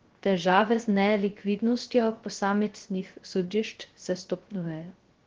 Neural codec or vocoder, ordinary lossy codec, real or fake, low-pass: codec, 16 kHz, 0.3 kbps, FocalCodec; Opus, 16 kbps; fake; 7.2 kHz